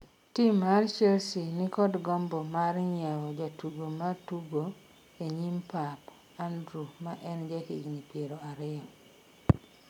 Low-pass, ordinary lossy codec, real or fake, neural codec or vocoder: 19.8 kHz; none; real; none